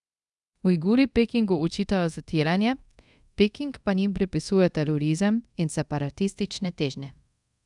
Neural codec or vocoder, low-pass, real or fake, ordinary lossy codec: codec, 24 kHz, 0.5 kbps, DualCodec; 10.8 kHz; fake; none